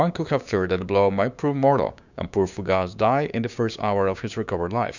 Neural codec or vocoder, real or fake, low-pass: codec, 16 kHz, 6 kbps, DAC; fake; 7.2 kHz